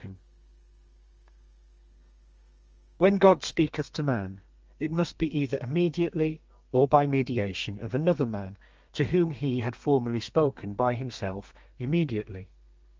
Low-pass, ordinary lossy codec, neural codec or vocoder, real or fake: 7.2 kHz; Opus, 24 kbps; codec, 32 kHz, 1.9 kbps, SNAC; fake